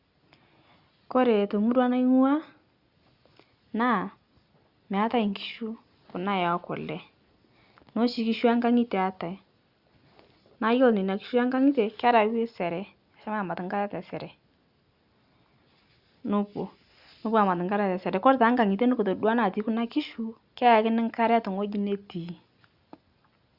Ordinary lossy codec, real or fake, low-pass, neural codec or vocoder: Opus, 64 kbps; real; 5.4 kHz; none